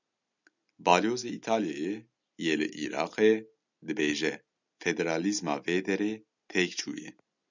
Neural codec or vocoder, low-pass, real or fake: none; 7.2 kHz; real